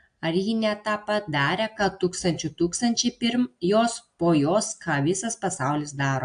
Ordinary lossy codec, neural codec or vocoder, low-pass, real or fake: AAC, 64 kbps; none; 9.9 kHz; real